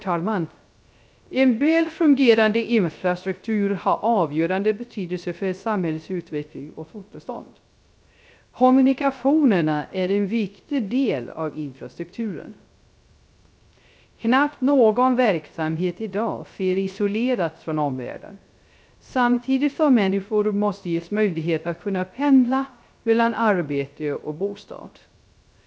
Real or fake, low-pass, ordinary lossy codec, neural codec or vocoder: fake; none; none; codec, 16 kHz, 0.3 kbps, FocalCodec